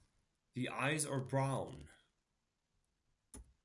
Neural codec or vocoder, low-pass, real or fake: none; 10.8 kHz; real